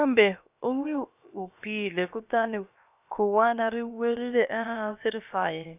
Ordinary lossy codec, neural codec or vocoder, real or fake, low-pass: none; codec, 16 kHz, about 1 kbps, DyCAST, with the encoder's durations; fake; 3.6 kHz